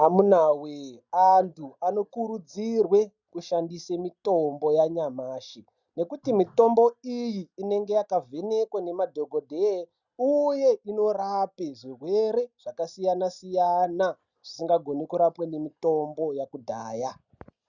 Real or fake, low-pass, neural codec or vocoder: real; 7.2 kHz; none